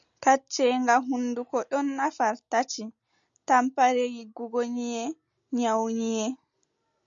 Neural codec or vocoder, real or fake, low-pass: none; real; 7.2 kHz